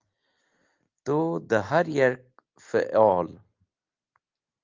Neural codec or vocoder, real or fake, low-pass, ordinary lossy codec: none; real; 7.2 kHz; Opus, 24 kbps